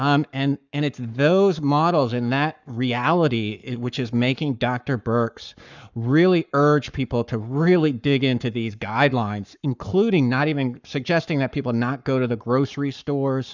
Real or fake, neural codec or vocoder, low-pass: fake; codec, 44.1 kHz, 7.8 kbps, Pupu-Codec; 7.2 kHz